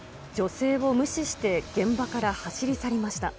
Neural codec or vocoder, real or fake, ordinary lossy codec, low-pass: none; real; none; none